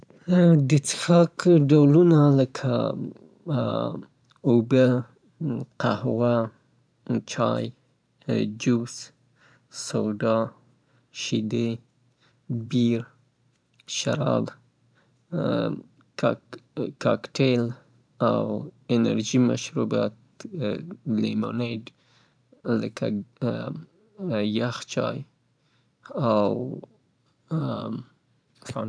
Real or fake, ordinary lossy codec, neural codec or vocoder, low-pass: fake; none; vocoder, 44.1 kHz, 128 mel bands every 512 samples, BigVGAN v2; 9.9 kHz